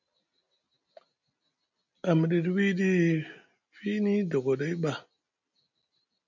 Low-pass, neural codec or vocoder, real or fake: 7.2 kHz; none; real